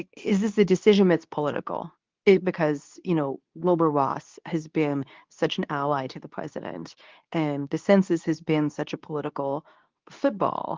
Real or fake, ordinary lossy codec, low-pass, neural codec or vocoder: fake; Opus, 24 kbps; 7.2 kHz; codec, 24 kHz, 0.9 kbps, WavTokenizer, medium speech release version 2